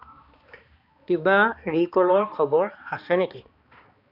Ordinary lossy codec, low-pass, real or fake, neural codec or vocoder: none; 5.4 kHz; fake; codec, 16 kHz, 2 kbps, X-Codec, HuBERT features, trained on balanced general audio